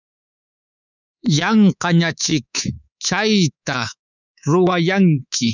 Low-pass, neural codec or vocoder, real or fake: 7.2 kHz; codec, 24 kHz, 3.1 kbps, DualCodec; fake